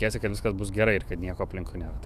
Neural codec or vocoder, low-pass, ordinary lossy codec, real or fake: autoencoder, 48 kHz, 128 numbers a frame, DAC-VAE, trained on Japanese speech; 14.4 kHz; AAC, 96 kbps; fake